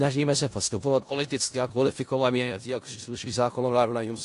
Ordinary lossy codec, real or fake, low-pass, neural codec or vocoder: AAC, 48 kbps; fake; 10.8 kHz; codec, 16 kHz in and 24 kHz out, 0.4 kbps, LongCat-Audio-Codec, four codebook decoder